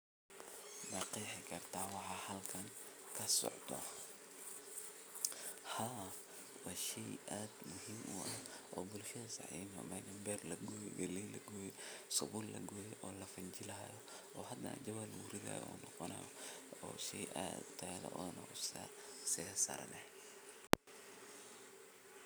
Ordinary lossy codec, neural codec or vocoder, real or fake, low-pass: none; none; real; none